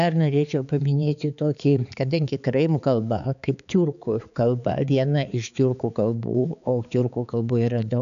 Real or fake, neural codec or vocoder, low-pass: fake; codec, 16 kHz, 4 kbps, X-Codec, HuBERT features, trained on balanced general audio; 7.2 kHz